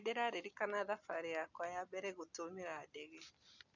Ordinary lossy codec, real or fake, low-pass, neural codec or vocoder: none; real; 7.2 kHz; none